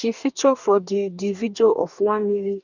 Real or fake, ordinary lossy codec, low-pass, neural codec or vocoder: fake; none; 7.2 kHz; codec, 44.1 kHz, 2.6 kbps, DAC